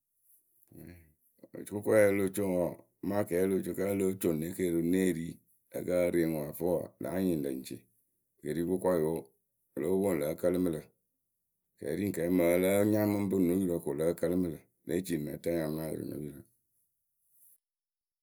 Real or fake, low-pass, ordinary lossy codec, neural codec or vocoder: real; none; none; none